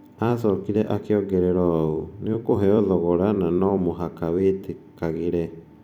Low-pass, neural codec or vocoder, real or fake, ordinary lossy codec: 19.8 kHz; vocoder, 44.1 kHz, 128 mel bands every 256 samples, BigVGAN v2; fake; none